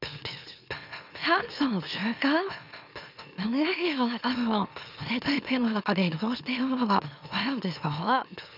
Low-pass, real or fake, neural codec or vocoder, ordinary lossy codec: 5.4 kHz; fake; autoencoder, 44.1 kHz, a latent of 192 numbers a frame, MeloTTS; none